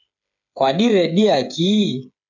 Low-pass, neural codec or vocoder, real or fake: 7.2 kHz; codec, 16 kHz, 8 kbps, FreqCodec, smaller model; fake